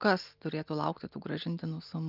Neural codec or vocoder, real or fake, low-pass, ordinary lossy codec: none; real; 5.4 kHz; Opus, 32 kbps